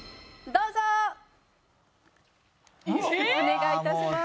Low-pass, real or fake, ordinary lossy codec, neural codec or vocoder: none; real; none; none